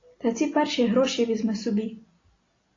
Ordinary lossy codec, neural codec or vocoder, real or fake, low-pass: AAC, 32 kbps; none; real; 7.2 kHz